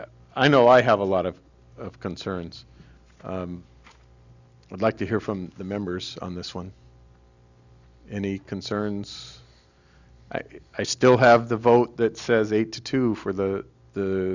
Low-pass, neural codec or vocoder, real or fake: 7.2 kHz; none; real